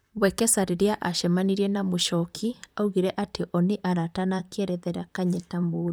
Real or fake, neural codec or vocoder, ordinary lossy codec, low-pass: fake; vocoder, 44.1 kHz, 128 mel bands, Pupu-Vocoder; none; none